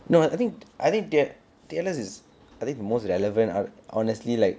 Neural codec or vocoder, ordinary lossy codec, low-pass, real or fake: none; none; none; real